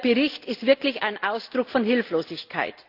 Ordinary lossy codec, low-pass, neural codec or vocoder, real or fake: Opus, 24 kbps; 5.4 kHz; none; real